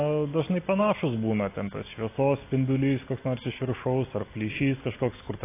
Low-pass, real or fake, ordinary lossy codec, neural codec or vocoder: 3.6 kHz; real; AAC, 24 kbps; none